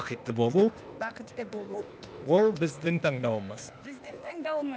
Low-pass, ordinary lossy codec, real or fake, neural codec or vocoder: none; none; fake; codec, 16 kHz, 0.8 kbps, ZipCodec